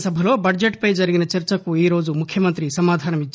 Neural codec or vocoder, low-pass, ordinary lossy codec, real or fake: none; none; none; real